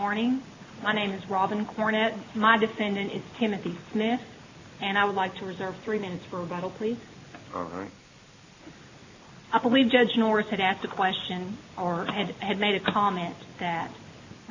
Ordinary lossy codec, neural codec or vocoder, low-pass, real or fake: AAC, 48 kbps; none; 7.2 kHz; real